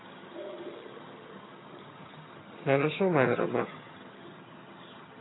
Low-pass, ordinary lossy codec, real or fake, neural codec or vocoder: 7.2 kHz; AAC, 16 kbps; fake; vocoder, 22.05 kHz, 80 mel bands, HiFi-GAN